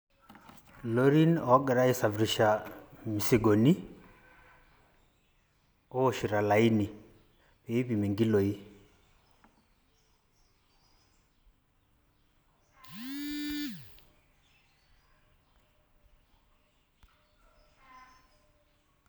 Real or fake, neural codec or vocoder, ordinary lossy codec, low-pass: real; none; none; none